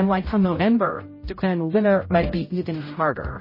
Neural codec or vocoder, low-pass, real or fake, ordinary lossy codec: codec, 16 kHz, 0.5 kbps, X-Codec, HuBERT features, trained on general audio; 5.4 kHz; fake; MP3, 24 kbps